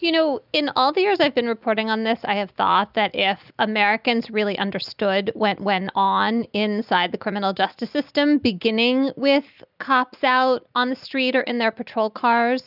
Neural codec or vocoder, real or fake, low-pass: none; real; 5.4 kHz